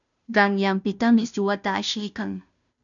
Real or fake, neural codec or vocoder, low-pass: fake; codec, 16 kHz, 0.5 kbps, FunCodec, trained on Chinese and English, 25 frames a second; 7.2 kHz